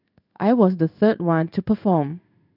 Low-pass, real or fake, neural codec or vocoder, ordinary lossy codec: 5.4 kHz; fake; codec, 16 kHz in and 24 kHz out, 1 kbps, XY-Tokenizer; none